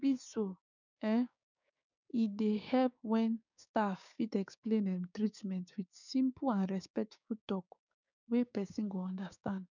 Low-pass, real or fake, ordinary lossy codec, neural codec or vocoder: 7.2 kHz; fake; none; codec, 16 kHz, 6 kbps, DAC